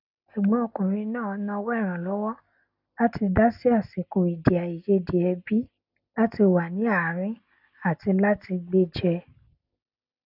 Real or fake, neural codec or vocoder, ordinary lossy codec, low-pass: real; none; none; 5.4 kHz